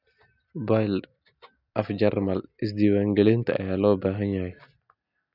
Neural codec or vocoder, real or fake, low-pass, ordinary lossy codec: none; real; 5.4 kHz; none